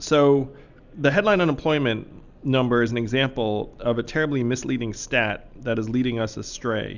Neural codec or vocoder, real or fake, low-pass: none; real; 7.2 kHz